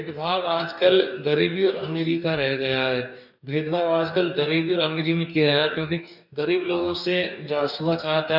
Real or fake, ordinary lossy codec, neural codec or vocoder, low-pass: fake; none; codec, 44.1 kHz, 2.6 kbps, DAC; 5.4 kHz